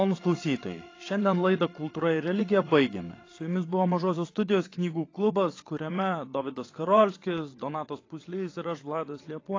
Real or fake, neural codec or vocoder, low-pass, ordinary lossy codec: fake; vocoder, 22.05 kHz, 80 mel bands, WaveNeXt; 7.2 kHz; AAC, 32 kbps